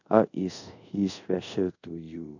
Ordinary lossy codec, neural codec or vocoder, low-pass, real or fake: none; codec, 24 kHz, 0.5 kbps, DualCodec; 7.2 kHz; fake